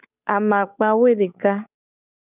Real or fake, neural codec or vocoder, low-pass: fake; codec, 16 kHz, 8 kbps, FunCodec, trained on Chinese and English, 25 frames a second; 3.6 kHz